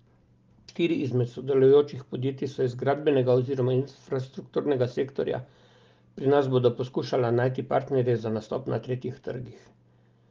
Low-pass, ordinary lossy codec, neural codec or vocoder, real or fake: 7.2 kHz; Opus, 32 kbps; none; real